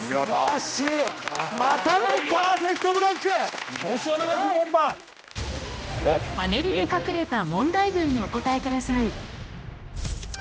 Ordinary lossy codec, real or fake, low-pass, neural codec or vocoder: none; fake; none; codec, 16 kHz, 1 kbps, X-Codec, HuBERT features, trained on general audio